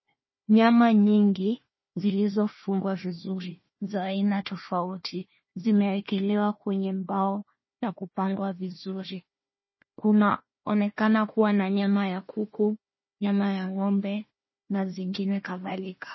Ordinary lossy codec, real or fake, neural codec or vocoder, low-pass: MP3, 24 kbps; fake; codec, 16 kHz, 1 kbps, FunCodec, trained on Chinese and English, 50 frames a second; 7.2 kHz